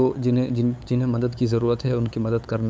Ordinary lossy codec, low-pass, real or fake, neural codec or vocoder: none; none; fake; codec, 16 kHz, 8 kbps, FunCodec, trained on LibriTTS, 25 frames a second